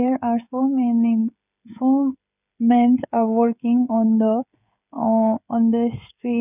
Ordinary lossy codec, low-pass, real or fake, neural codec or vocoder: none; 3.6 kHz; fake; codec, 16 kHz, 16 kbps, FreqCodec, smaller model